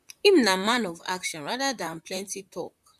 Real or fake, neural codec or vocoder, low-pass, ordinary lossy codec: fake; vocoder, 44.1 kHz, 128 mel bands, Pupu-Vocoder; 14.4 kHz; MP3, 96 kbps